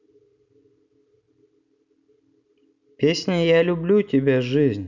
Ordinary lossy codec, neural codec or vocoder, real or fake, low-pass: none; none; real; 7.2 kHz